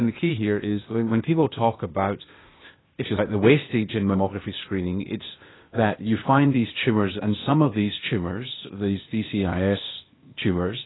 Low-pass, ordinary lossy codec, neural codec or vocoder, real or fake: 7.2 kHz; AAC, 16 kbps; codec, 16 kHz, 0.8 kbps, ZipCodec; fake